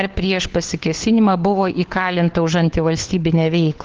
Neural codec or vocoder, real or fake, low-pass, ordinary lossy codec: codec, 16 kHz, 4 kbps, FunCodec, trained on LibriTTS, 50 frames a second; fake; 7.2 kHz; Opus, 16 kbps